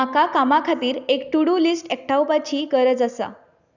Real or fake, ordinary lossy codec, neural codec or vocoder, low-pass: real; none; none; 7.2 kHz